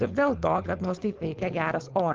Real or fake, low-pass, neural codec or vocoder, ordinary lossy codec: fake; 7.2 kHz; codec, 16 kHz, 4.8 kbps, FACodec; Opus, 24 kbps